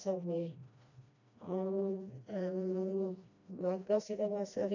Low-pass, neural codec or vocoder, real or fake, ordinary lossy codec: 7.2 kHz; codec, 16 kHz, 1 kbps, FreqCodec, smaller model; fake; none